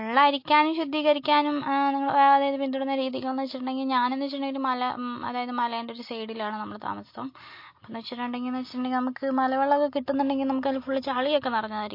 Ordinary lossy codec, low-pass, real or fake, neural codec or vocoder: MP3, 32 kbps; 5.4 kHz; real; none